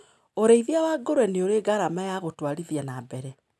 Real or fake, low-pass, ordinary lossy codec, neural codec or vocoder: real; none; none; none